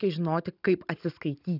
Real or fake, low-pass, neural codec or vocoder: real; 5.4 kHz; none